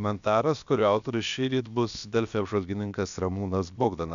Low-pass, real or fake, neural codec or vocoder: 7.2 kHz; fake; codec, 16 kHz, about 1 kbps, DyCAST, with the encoder's durations